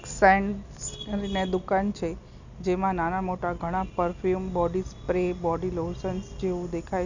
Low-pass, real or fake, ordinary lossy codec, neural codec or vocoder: 7.2 kHz; real; none; none